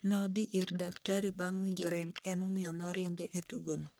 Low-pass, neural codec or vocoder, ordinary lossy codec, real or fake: none; codec, 44.1 kHz, 1.7 kbps, Pupu-Codec; none; fake